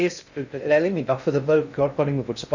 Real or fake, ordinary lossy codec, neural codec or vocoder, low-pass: fake; none; codec, 16 kHz in and 24 kHz out, 0.6 kbps, FocalCodec, streaming, 2048 codes; 7.2 kHz